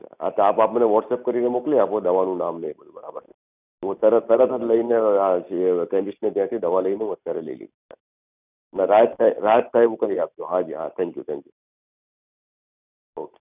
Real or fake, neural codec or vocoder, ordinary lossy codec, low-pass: real; none; none; 3.6 kHz